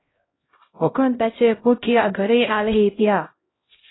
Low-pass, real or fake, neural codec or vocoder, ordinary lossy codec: 7.2 kHz; fake; codec, 16 kHz, 0.5 kbps, X-Codec, HuBERT features, trained on LibriSpeech; AAC, 16 kbps